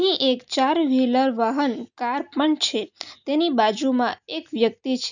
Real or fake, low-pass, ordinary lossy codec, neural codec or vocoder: real; 7.2 kHz; none; none